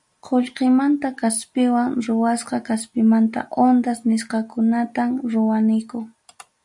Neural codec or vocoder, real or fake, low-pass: none; real; 10.8 kHz